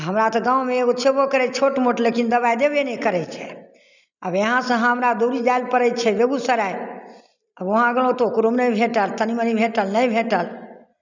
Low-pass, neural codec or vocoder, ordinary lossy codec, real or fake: 7.2 kHz; none; none; real